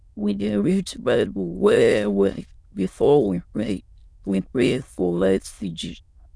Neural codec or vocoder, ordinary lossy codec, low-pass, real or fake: autoencoder, 22.05 kHz, a latent of 192 numbers a frame, VITS, trained on many speakers; none; none; fake